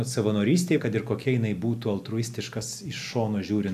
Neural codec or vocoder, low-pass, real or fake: none; 14.4 kHz; real